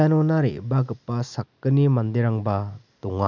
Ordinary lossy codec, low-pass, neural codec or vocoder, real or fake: none; 7.2 kHz; none; real